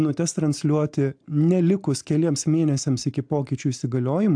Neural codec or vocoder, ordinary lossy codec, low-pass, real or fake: none; AAC, 64 kbps; 9.9 kHz; real